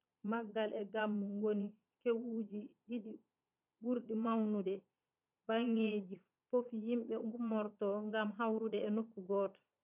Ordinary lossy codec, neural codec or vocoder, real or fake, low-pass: none; vocoder, 22.05 kHz, 80 mel bands, Vocos; fake; 3.6 kHz